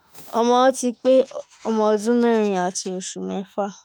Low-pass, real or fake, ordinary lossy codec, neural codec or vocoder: none; fake; none; autoencoder, 48 kHz, 32 numbers a frame, DAC-VAE, trained on Japanese speech